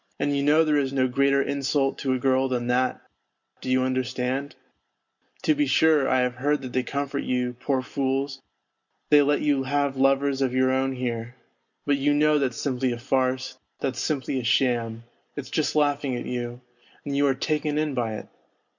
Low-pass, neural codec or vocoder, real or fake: 7.2 kHz; none; real